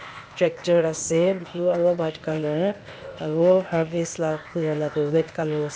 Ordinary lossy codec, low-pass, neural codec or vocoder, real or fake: none; none; codec, 16 kHz, 0.8 kbps, ZipCodec; fake